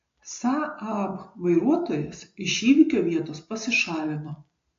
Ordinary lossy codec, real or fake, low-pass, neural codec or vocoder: AAC, 48 kbps; real; 7.2 kHz; none